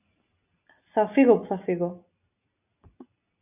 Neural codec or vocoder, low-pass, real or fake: none; 3.6 kHz; real